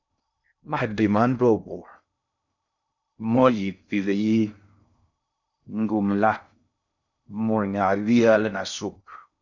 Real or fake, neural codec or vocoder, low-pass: fake; codec, 16 kHz in and 24 kHz out, 0.6 kbps, FocalCodec, streaming, 2048 codes; 7.2 kHz